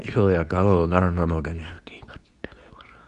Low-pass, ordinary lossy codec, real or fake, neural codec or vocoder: 10.8 kHz; none; fake; codec, 24 kHz, 0.9 kbps, WavTokenizer, medium speech release version 2